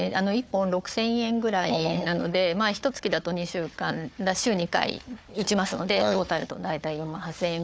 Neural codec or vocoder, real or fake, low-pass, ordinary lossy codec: codec, 16 kHz, 4 kbps, FunCodec, trained on Chinese and English, 50 frames a second; fake; none; none